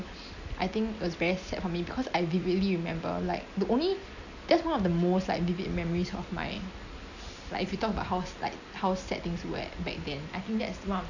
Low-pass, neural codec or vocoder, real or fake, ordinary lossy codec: 7.2 kHz; none; real; none